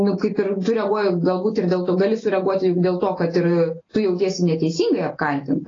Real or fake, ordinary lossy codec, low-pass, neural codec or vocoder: real; AAC, 32 kbps; 7.2 kHz; none